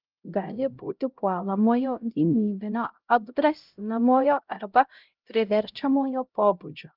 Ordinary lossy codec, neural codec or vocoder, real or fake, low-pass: Opus, 32 kbps; codec, 16 kHz, 0.5 kbps, X-Codec, HuBERT features, trained on LibriSpeech; fake; 5.4 kHz